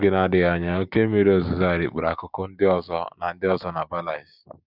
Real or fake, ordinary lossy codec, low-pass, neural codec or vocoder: fake; none; 5.4 kHz; codec, 16 kHz, 6 kbps, DAC